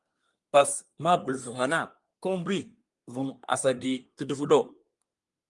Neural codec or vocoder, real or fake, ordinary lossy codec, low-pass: codec, 24 kHz, 1 kbps, SNAC; fake; Opus, 32 kbps; 10.8 kHz